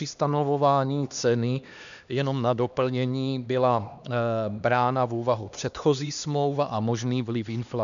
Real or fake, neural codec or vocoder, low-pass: fake; codec, 16 kHz, 2 kbps, X-Codec, HuBERT features, trained on LibriSpeech; 7.2 kHz